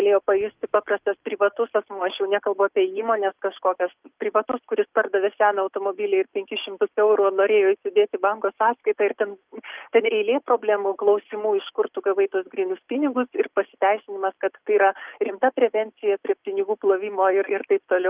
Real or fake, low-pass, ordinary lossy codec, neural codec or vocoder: real; 3.6 kHz; Opus, 24 kbps; none